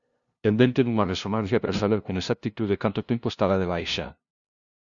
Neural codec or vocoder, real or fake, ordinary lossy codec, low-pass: codec, 16 kHz, 0.5 kbps, FunCodec, trained on LibriTTS, 25 frames a second; fake; Opus, 64 kbps; 7.2 kHz